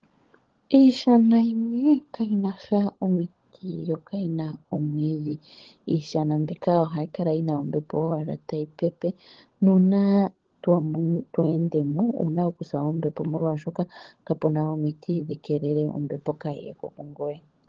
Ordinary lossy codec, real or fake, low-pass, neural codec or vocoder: Opus, 16 kbps; fake; 7.2 kHz; codec, 16 kHz, 16 kbps, FunCodec, trained on LibriTTS, 50 frames a second